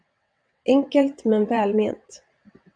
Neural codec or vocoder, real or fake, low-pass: vocoder, 22.05 kHz, 80 mel bands, WaveNeXt; fake; 9.9 kHz